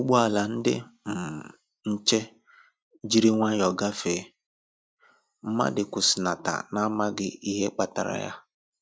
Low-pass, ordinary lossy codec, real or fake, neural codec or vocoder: none; none; real; none